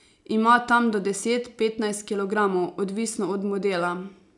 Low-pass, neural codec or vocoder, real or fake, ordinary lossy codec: 10.8 kHz; none; real; none